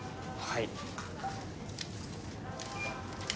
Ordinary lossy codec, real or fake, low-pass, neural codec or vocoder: none; real; none; none